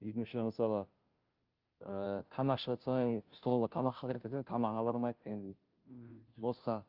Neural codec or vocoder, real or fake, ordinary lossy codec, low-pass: codec, 16 kHz, 0.5 kbps, FunCodec, trained on Chinese and English, 25 frames a second; fake; Opus, 24 kbps; 5.4 kHz